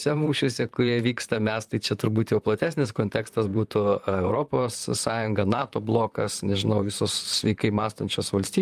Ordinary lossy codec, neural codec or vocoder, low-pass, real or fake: Opus, 32 kbps; vocoder, 44.1 kHz, 128 mel bands, Pupu-Vocoder; 14.4 kHz; fake